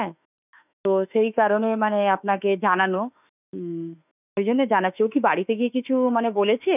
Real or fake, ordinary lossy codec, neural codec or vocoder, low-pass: fake; none; autoencoder, 48 kHz, 32 numbers a frame, DAC-VAE, trained on Japanese speech; 3.6 kHz